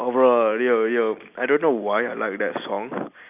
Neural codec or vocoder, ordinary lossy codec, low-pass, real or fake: none; none; 3.6 kHz; real